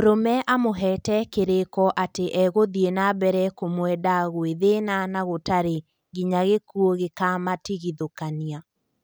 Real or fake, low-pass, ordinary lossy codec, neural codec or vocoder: real; none; none; none